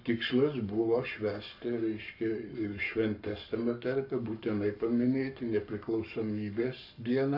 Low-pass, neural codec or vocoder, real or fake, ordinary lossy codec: 5.4 kHz; codec, 44.1 kHz, 7.8 kbps, Pupu-Codec; fake; AAC, 48 kbps